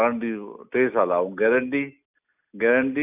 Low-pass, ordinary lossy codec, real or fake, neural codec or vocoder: 3.6 kHz; none; real; none